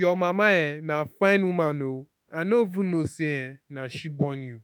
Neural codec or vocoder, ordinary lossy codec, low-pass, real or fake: autoencoder, 48 kHz, 32 numbers a frame, DAC-VAE, trained on Japanese speech; none; none; fake